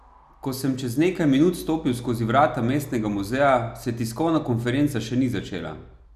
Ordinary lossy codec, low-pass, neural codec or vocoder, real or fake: none; 14.4 kHz; none; real